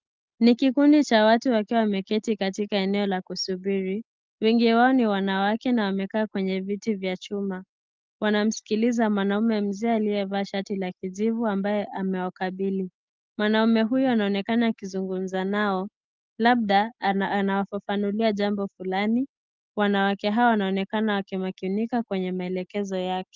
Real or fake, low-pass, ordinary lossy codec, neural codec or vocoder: real; 7.2 kHz; Opus, 24 kbps; none